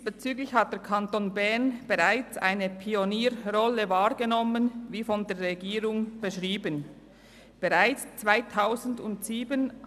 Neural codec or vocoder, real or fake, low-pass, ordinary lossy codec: none; real; 14.4 kHz; none